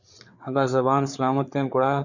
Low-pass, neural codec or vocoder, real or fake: 7.2 kHz; codec, 16 kHz, 4 kbps, FreqCodec, larger model; fake